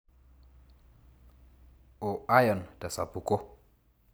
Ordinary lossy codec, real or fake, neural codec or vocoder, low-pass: none; real; none; none